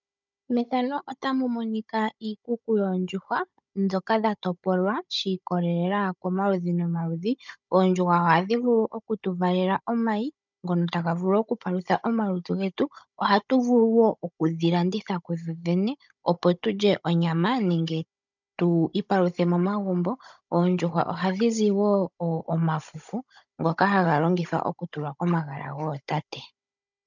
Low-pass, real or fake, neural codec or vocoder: 7.2 kHz; fake; codec, 16 kHz, 16 kbps, FunCodec, trained on Chinese and English, 50 frames a second